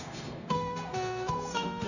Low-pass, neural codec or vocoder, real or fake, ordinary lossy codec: 7.2 kHz; codec, 16 kHz, 0.9 kbps, LongCat-Audio-Codec; fake; none